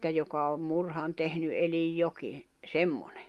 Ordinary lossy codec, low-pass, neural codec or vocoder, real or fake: Opus, 32 kbps; 14.4 kHz; none; real